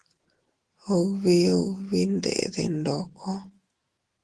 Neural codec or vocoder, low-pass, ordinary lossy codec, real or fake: autoencoder, 48 kHz, 128 numbers a frame, DAC-VAE, trained on Japanese speech; 10.8 kHz; Opus, 16 kbps; fake